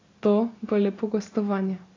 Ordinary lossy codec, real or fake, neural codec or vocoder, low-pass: AAC, 32 kbps; real; none; 7.2 kHz